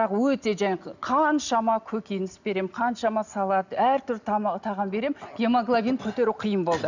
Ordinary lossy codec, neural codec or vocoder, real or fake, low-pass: none; none; real; 7.2 kHz